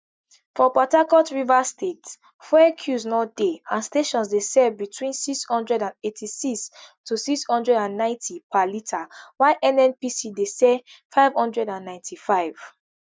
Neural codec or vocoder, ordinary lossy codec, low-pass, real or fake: none; none; none; real